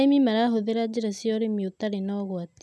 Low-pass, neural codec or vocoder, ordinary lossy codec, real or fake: none; none; none; real